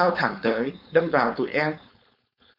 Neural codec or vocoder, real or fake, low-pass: codec, 16 kHz, 4.8 kbps, FACodec; fake; 5.4 kHz